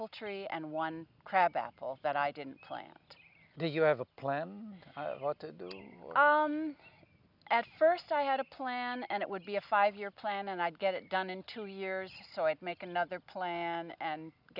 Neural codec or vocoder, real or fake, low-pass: none; real; 5.4 kHz